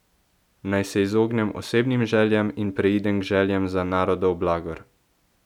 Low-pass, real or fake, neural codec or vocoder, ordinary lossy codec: 19.8 kHz; real; none; none